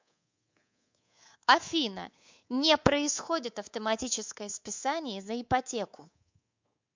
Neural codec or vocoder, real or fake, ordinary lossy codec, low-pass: codec, 24 kHz, 3.1 kbps, DualCodec; fake; MP3, 64 kbps; 7.2 kHz